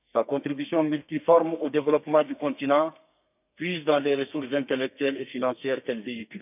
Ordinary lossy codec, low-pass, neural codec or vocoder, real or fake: none; 3.6 kHz; codec, 32 kHz, 1.9 kbps, SNAC; fake